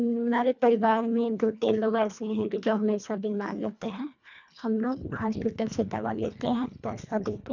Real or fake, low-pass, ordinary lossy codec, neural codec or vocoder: fake; 7.2 kHz; none; codec, 24 kHz, 1.5 kbps, HILCodec